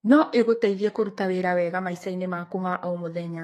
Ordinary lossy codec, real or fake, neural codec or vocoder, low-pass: AAC, 48 kbps; fake; codec, 32 kHz, 1.9 kbps, SNAC; 14.4 kHz